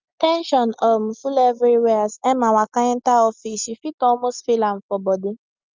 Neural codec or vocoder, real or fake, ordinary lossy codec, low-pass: none; real; Opus, 32 kbps; 7.2 kHz